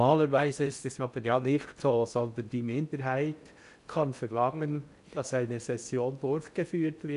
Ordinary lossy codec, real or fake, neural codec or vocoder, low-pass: none; fake; codec, 16 kHz in and 24 kHz out, 0.6 kbps, FocalCodec, streaming, 4096 codes; 10.8 kHz